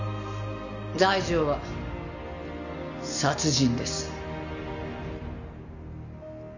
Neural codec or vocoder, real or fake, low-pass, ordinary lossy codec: none; real; 7.2 kHz; none